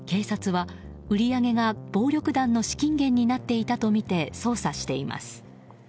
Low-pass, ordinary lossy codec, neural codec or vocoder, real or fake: none; none; none; real